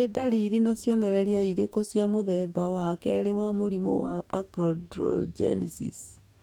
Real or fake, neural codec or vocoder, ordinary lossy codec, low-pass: fake; codec, 44.1 kHz, 2.6 kbps, DAC; none; 19.8 kHz